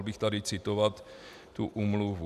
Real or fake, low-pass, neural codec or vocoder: real; 14.4 kHz; none